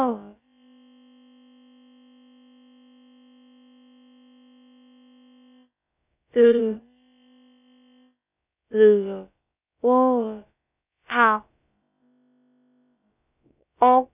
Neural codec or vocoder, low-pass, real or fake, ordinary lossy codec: codec, 16 kHz, about 1 kbps, DyCAST, with the encoder's durations; 3.6 kHz; fake; none